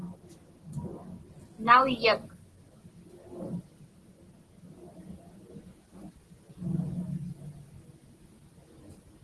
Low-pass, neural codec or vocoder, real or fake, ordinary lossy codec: 10.8 kHz; none; real; Opus, 16 kbps